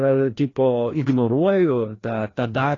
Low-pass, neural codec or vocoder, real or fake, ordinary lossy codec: 7.2 kHz; codec, 16 kHz, 1 kbps, FreqCodec, larger model; fake; AAC, 32 kbps